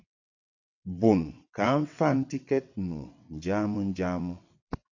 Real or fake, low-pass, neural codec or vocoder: fake; 7.2 kHz; vocoder, 22.05 kHz, 80 mel bands, WaveNeXt